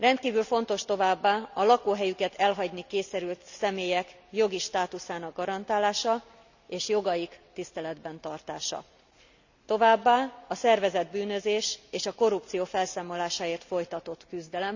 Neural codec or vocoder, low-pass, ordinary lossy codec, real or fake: none; 7.2 kHz; none; real